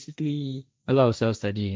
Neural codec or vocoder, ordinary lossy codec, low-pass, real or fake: codec, 16 kHz, 1.1 kbps, Voila-Tokenizer; none; none; fake